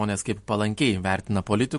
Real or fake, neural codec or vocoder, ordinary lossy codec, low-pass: real; none; MP3, 48 kbps; 14.4 kHz